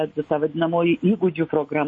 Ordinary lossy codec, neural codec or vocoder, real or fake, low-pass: MP3, 32 kbps; none; real; 9.9 kHz